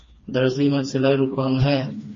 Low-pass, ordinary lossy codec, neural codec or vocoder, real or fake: 7.2 kHz; MP3, 32 kbps; codec, 16 kHz, 2 kbps, FreqCodec, smaller model; fake